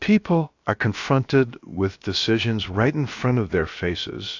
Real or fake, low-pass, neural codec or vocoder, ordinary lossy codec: fake; 7.2 kHz; codec, 16 kHz, about 1 kbps, DyCAST, with the encoder's durations; AAC, 48 kbps